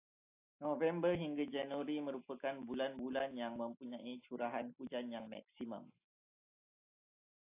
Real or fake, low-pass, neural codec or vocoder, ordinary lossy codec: real; 3.6 kHz; none; AAC, 24 kbps